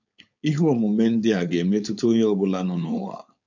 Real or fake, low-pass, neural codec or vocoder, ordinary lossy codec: fake; 7.2 kHz; codec, 16 kHz, 4.8 kbps, FACodec; none